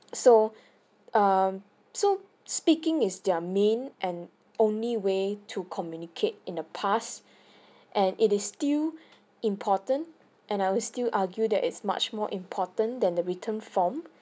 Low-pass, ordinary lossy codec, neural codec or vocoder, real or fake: none; none; none; real